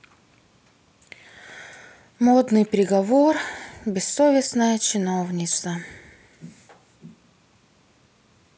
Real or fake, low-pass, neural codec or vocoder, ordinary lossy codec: real; none; none; none